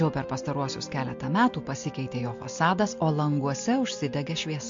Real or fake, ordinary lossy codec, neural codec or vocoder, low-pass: real; MP3, 48 kbps; none; 7.2 kHz